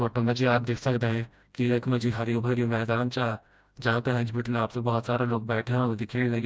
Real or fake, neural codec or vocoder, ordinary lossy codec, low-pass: fake; codec, 16 kHz, 1 kbps, FreqCodec, smaller model; none; none